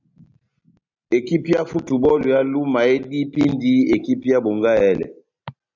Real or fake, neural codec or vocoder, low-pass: real; none; 7.2 kHz